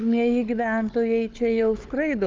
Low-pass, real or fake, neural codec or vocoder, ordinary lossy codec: 7.2 kHz; fake; codec, 16 kHz, 4 kbps, FunCodec, trained on Chinese and English, 50 frames a second; Opus, 24 kbps